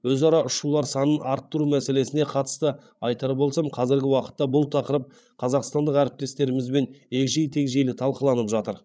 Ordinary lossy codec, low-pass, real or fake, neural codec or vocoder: none; none; fake; codec, 16 kHz, 8 kbps, FreqCodec, larger model